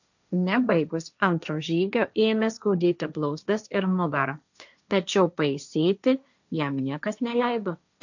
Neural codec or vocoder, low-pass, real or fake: codec, 16 kHz, 1.1 kbps, Voila-Tokenizer; 7.2 kHz; fake